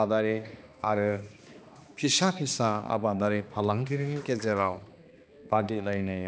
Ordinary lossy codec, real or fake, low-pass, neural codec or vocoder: none; fake; none; codec, 16 kHz, 2 kbps, X-Codec, HuBERT features, trained on balanced general audio